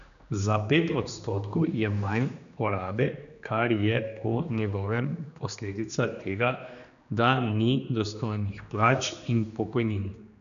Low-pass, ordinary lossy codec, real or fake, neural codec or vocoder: 7.2 kHz; none; fake; codec, 16 kHz, 2 kbps, X-Codec, HuBERT features, trained on general audio